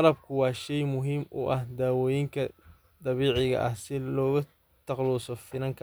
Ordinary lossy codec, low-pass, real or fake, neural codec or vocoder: none; none; real; none